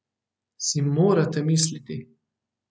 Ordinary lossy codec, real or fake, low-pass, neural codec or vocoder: none; real; none; none